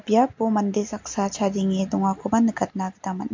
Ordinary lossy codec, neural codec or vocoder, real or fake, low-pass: MP3, 48 kbps; none; real; 7.2 kHz